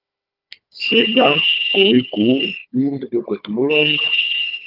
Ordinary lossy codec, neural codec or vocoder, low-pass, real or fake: Opus, 32 kbps; codec, 16 kHz, 4 kbps, FunCodec, trained on Chinese and English, 50 frames a second; 5.4 kHz; fake